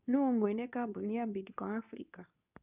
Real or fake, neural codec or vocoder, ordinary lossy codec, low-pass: fake; codec, 24 kHz, 0.9 kbps, WavTokenizer, medium speech release version 2; none; 3.6 kHz